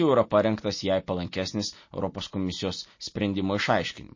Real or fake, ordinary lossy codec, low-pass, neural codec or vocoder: real; MP3, 32 kbps; 7.2 kHz; none